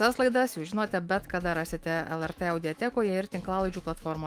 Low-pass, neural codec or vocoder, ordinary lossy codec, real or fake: 14.4 kHz; autoencoder, 48 kHz, 128 numbers a frame, DAC-VAE, trained on Japanese speech; Opus, 32 kbps; fake